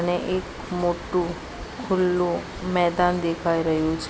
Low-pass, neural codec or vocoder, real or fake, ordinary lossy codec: none; none; real; none